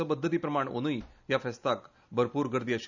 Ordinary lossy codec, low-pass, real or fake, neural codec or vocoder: none; 7.2 kHz; real; none